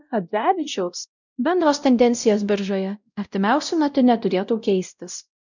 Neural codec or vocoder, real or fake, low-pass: codec, 16 kHz, 0.5 kbps, X-Codec, WavLM features, trained on Multilingual LibriSpeech; fake; 7.2 kHz